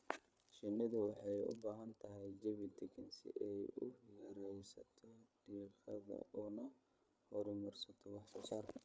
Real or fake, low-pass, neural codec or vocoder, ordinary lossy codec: fake; none; codec, 16 kHz, 8 kbps, FreqCodec, larger model; none